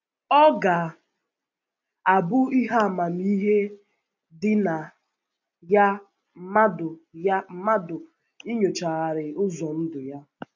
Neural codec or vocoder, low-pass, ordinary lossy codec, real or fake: none; 7.2 kHz; none; real